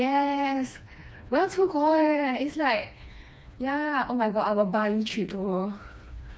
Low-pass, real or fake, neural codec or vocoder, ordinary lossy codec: none; fake; codec, 16 kHz, 2 kbps, FreqCodec, smaller model; none